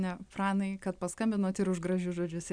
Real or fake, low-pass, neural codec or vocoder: real; 9.9 kHz; none